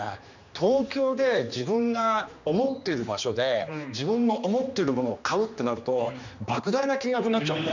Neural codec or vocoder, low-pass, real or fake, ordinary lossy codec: codec, 16 kHz, 2 kbps, X-Codec, HuBERT features, trained on general audio; 7.2 kHz; fake; none